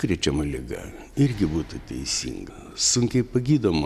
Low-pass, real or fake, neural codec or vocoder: 14.4 kHz; real; none